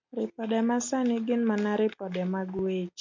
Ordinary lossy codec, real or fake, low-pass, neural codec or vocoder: MP3, 64 kbps; real; 7.2 kHz; none